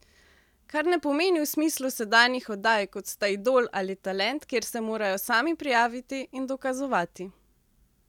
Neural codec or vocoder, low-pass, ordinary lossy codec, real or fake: none; 19.8 kHz; none; real